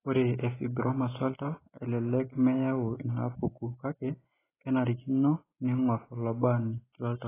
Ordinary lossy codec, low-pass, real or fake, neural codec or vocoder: AAC, 16 kbps; 3.6 kHz; real; none